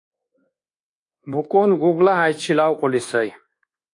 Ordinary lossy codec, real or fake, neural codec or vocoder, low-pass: AAC, 48 kbps; fake; codec, 24 kHz, 1.2 kbps, DualCodec; 10.8 kHz